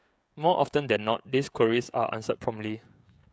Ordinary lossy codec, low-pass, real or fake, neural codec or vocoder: none; none; fake; codec, 16 kHz, 16 kbps, FreqCodec, smaller model